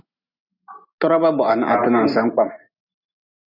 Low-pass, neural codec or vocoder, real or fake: 5.4 kHz; none; real